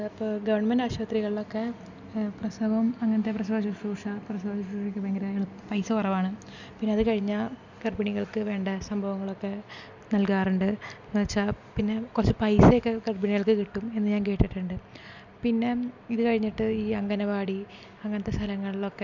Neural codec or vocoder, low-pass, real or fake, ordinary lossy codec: none; 7.2 kHz; real; none